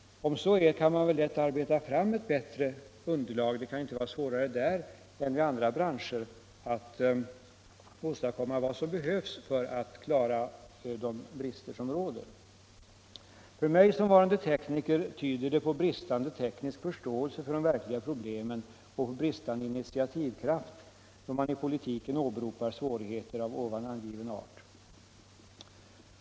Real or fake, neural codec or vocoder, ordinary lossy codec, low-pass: real; none; none; none